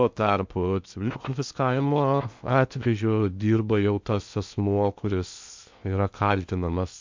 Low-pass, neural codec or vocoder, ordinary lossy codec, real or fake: 7.2 kHz; codec, 16 kHz in and 24 kHz out, 0.8 kbps, FocalCodec, streaming, 65536 codes; MP3, 64 kbps; fake